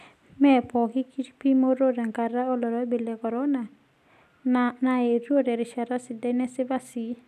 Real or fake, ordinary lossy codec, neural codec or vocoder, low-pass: real; none; none; 14.4 kHz